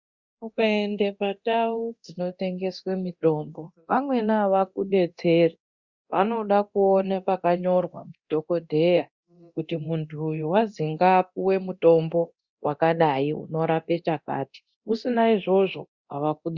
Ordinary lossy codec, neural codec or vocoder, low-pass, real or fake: Opus, 64 kbps; codec, 24 kHz, 0.9 kbps, DualCodec; 7.2 kHz; fake